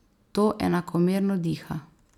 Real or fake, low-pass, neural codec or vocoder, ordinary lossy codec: real; 19.8 kHz; none; none